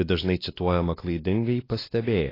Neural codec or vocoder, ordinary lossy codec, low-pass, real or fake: codec, 16 kHz, 2 kbps, X-Codec, WavLM features, trained on Multilingual LibriSpeech; AAC, 24 kbps; 5.4 kHz; fake